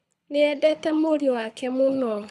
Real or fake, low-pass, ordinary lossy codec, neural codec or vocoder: fake; none; none; codec, 24 kHz, 6 kbps, HILCodec